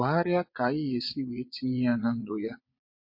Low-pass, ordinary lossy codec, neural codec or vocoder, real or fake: 5.4 kHz; MP3, 32 kbps; vocoder, 22.05 kHz, 80 mel bands, Vocos; fake